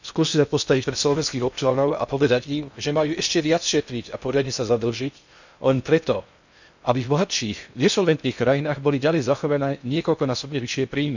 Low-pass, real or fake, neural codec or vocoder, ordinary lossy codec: 7.2 kHz; fake; codec, 16 kHz in and 24 kHz out, 0.6 kbps, FocalCodec, streaming, 2048 codes; none